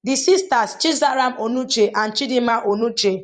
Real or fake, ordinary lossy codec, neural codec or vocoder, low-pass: fake; Opus, 64 kbps; vocoder, 44.1 kHz, 128 mel bands every 256 samples, BigVGAN v2; 10.8 kHz